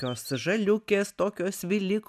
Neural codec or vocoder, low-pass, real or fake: none; 14.4 kHz; real